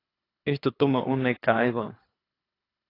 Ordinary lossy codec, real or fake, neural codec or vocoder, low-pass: AAC, 24 kbps; fake; codec, 24 kHz, 3 kbps, HILCodec; 5.4 kHz